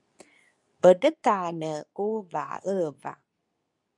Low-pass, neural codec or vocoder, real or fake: 10.8 kHz; codec, 24 kHz, 0.9 kbps, WavTokenizer, medium speech release version 2; fake